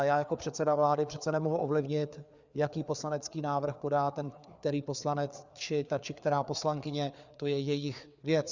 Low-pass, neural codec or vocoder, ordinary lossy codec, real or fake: 7.2 kHz; codec, 16 kHz, 4 kbps, FreqCodec, larger model; Opus, 64 kbps; fake